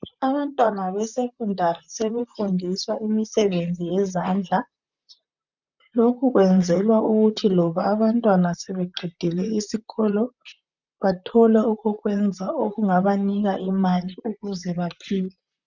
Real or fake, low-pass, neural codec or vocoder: fake; 7.2 kHz; vocoder, 44.1 kHz, 128 mel bands, Pupu-Vocoder